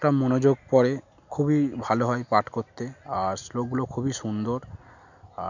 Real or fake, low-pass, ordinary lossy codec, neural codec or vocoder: real; 7.2 kHz; none; none